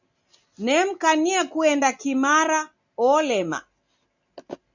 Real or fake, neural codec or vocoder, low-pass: real; none; 7.2 kHz